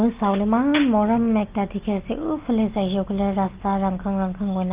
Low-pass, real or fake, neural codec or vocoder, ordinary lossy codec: 3.6 kHz; real; none; Opus, 32 kbps